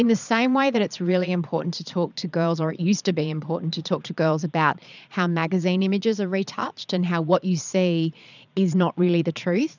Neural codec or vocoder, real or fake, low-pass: vocoder, 22.05 kHz, 80 mel bands, Vocos; fake; 7.2 kHz